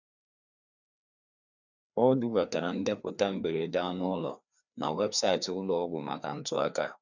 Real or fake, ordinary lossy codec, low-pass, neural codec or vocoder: fake; none; 7.2 kHz; codec, 16 kHz, 2 kbps, FreqCodec, larger model